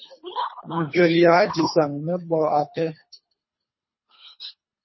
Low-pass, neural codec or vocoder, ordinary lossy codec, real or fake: 7.2 kHz; codec, 24 kHz, 3 kbps, HILCodec; MP3, 24 kbps; fake